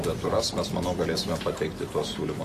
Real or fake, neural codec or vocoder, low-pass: real; none; 14.4 kHz